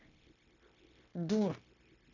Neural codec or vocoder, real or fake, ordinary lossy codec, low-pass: codec, 16 kHz, 0.9 kbps, LongCat-Audio-Codec; fake; none; 7.2 kHz